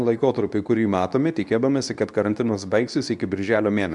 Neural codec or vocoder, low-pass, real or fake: codec, 24 kHz, 0.9 kbps, WavTokenizer, medium speech release version 2; 10.8 kHz; fake